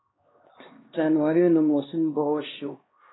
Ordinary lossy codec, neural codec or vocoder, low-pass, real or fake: AAC, 16 kbps; codec, 16 kHz, 1 kbps, X-Codec, HuBERT features, trained on LibriSpeech; 7.2 kHz; fake